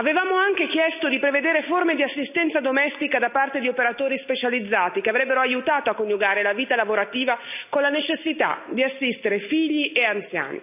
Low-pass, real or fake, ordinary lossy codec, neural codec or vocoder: 3.6 kHz; real; none; none